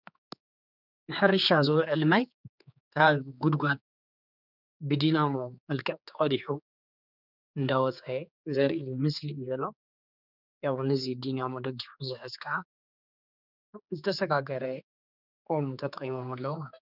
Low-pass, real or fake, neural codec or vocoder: 5.4 kHz; fake; codec, 16 kHz, 4 kbps, X-Codec, HuBERT features, trained on general audio